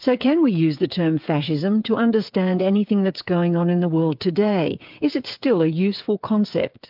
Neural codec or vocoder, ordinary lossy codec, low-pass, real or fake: codec, 16 kHz, 8 kbps, FreqCodec, smaller model; MP3, 48 kbps; 5.4 kHz; fake